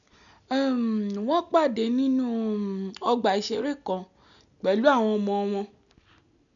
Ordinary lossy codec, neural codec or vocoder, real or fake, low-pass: none; none; real; 7.2 kHz